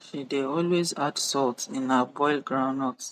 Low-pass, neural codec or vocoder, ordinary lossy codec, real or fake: 14.4 kHz; none; none; real